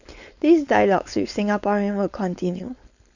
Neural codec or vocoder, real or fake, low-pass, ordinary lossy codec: codec, 16 kHz, 4.8 kbps, FACodec; fake; 7.2 kHz; none